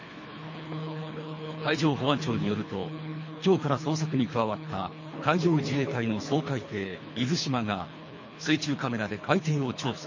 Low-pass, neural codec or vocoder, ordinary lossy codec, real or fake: 7.2 kHz; codec, 24 kHz, 3 kbps, HILCodec; MP3, 32 kbps; fake